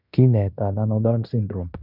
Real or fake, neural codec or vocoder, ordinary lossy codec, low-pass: fake; codec, 16 kHz in and 24 kHz out, 0.9 kbps, LongCat-Audio-Codec, fine tuned four codebook decoder; none; 5.4 kHz